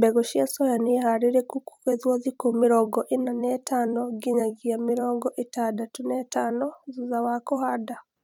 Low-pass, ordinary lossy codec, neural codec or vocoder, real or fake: 19.8 kHz; none; vocoder, 44.1 kHz, 128 mel bands every 256 samples, BigVGAN v2; fake